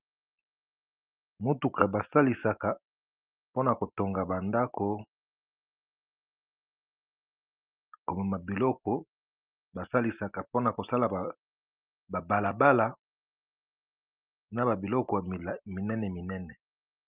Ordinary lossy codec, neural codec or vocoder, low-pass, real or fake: Opus, 24 kbps; none; 3.6 kHz; real